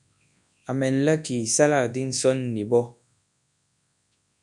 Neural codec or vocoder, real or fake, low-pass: codec, 24 kHz, 0.9 kbps, WavTokenizer, large speech release; fake; 10.8 kHz